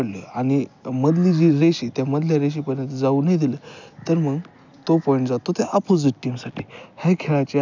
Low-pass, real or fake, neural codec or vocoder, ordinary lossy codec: 7.2 kHz; real; none; none